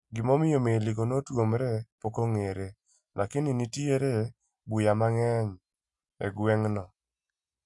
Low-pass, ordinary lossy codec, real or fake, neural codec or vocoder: 10.8 kHz; none; real; none